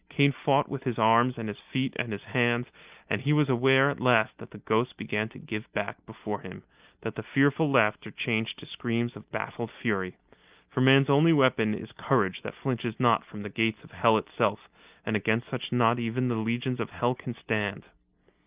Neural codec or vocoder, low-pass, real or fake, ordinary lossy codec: none; 3.6 kHz; real; Opus, 24 kbps